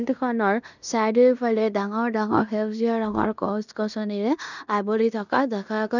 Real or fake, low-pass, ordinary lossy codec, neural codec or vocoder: fake; 7.2 kHz; none; codec, 16 kHz in and 24 kHz out, 0.9 kbps, LongCat-Audio-Codec, fine tuned four codebook decoder